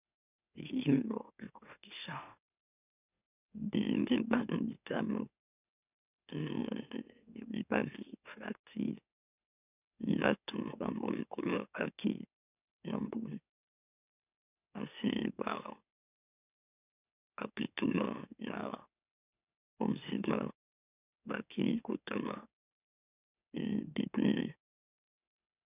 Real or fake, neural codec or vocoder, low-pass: fake; autoencoder, 44.1 kHz, a latent of 192 numbers a frame, MeloTTS; 3.6 kHz